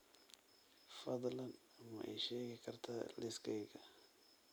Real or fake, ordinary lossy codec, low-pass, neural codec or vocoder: real; none; none; none